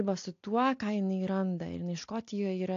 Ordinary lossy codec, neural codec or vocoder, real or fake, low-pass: AAC, 48 kbps; none; real; 7.2 kHz